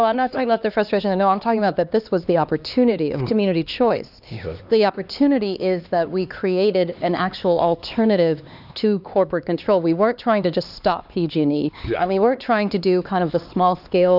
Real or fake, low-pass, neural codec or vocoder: fake; 5.4 kHz; codec, 16 kHz, 2 kbps, X-Codec, HuBERT features, trained on LibriSpeech